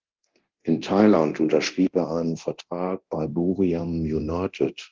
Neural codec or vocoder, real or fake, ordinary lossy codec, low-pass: codec, 24 kHz, 0.9 kbps, DualCodec; fake; Opus, 16 kbps; 7.2 kHz